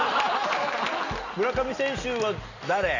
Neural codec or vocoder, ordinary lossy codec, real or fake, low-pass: none; none; real; 7.2 kHz